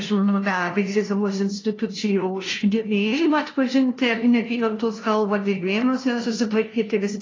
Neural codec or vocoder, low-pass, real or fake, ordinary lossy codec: codec, 16 kHz, 0.5 kbps, FunCodec, trained on LibriTTS, 25 frames a second; 7.2 kHz; fake; AAC, 32 kbps